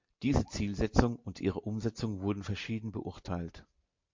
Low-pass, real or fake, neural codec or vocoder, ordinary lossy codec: 7.2 kHz; real; none; MP3, 48 kbps